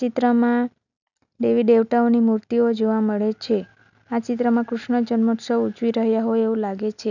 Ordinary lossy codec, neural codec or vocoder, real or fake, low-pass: none; none; real; 7.2 kHz